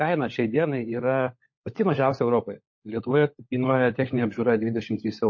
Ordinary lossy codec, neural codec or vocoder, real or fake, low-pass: MP3, 32 kbps; codec, 16 kHz, 16 kbps, FunCodec, trained on LibriTTS, 50 frames a second; fake; 7.2 kHz